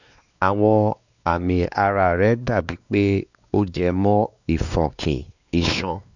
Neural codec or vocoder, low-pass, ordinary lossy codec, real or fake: codec, 16 kHz, 2 kbps, X-Codec, WavLM features, trained on Multilingual LibriSpeech; 7.2 kHz; none; fake